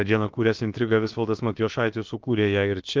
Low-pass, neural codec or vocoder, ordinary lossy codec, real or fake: 7.2 kHz; codec, 16 kHz, about 1 kbps, DyCAST, with the encoder's durations; Opus, 32 kbps; fake